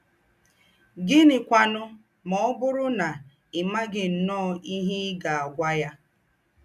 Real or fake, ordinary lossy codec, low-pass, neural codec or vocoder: real; none; 14.4 kHz; none